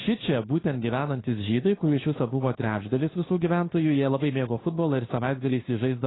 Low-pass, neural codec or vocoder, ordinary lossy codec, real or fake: 7.2 kHz; codec, 16 kHz, 2 kbps, FunCodec, trained on Chinese and English, 25 frames a second; AAC, 16 kbps; fake